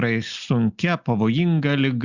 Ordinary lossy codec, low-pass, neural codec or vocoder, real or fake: Opus, 64 kbps; 7.2 kHz; none; real